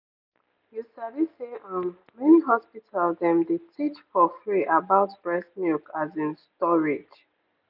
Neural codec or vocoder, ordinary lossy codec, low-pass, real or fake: none; none; 5.4 kHz; real